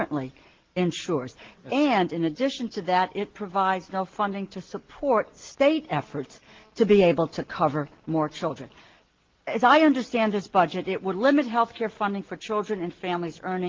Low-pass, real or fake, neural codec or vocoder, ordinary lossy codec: 7.2 kHz; real; none; Opus, 16 kbps